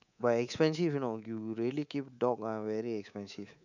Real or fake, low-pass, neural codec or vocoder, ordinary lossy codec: fake; 7.2 kHz; codec, 24 kHz, 3.1 kbps, DualCodec; none